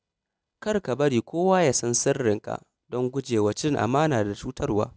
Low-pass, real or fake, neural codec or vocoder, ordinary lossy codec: none; real; none; none